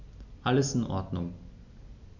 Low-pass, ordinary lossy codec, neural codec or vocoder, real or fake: 7.2 kHz; none; none; real